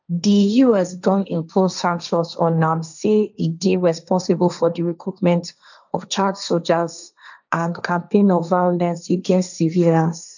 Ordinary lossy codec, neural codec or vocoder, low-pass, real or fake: none; codec, 16 kHz, 1.1 kbps, Voila-Tokenizer; 7.2 kHz; fake